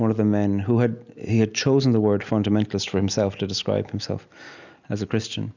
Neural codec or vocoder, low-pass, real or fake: none; 7.2 kHz; real